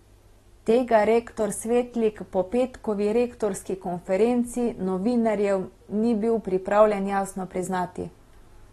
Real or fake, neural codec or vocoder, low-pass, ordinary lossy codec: real; none; 19.8 kHz; AAC, 32 kbps